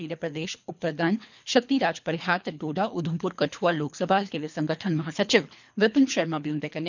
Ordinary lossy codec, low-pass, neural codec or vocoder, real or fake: none; 7.2 kHz; codec, 24 kHz, 3 kbps, HILCodec; fake